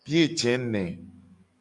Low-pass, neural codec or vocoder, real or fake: 10.8 kHz; codec, 44.1 kHz, 7.8 kbps, DAC; fake